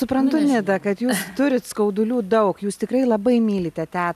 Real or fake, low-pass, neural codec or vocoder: real; 14.4 kHz; none